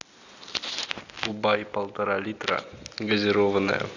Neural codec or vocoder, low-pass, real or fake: none; 7.2 kHz; real